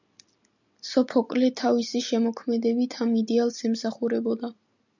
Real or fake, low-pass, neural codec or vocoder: real; 7.2 kHz; none